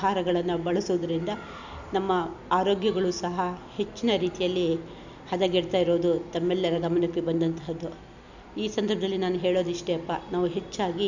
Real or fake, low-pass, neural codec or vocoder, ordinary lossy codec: real; 7.2 kHz; none; none